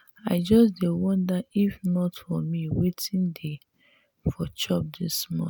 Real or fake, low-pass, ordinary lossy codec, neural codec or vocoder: real; none; none; none